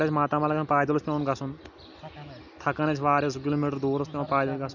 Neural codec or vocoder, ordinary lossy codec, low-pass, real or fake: none; none; 7.2 kHz; real